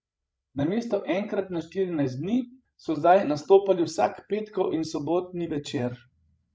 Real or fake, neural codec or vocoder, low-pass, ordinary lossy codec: fake; codec, 16 kHz, 16 kbps, FreqCodec, larger model; none; none